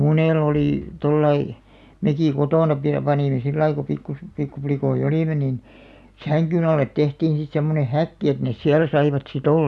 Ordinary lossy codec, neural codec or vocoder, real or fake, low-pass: none; none; real; none